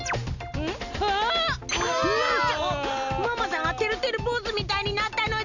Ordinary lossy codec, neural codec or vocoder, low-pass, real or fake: Opus, 64 kbps; none; 7.2 kHz; real